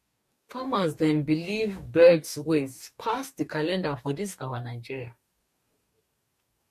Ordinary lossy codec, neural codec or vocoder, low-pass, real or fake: MP3, 64 kbps; codec, 44.1 kHz, 2.6 kbps, DAC; 14.4 kHz; fake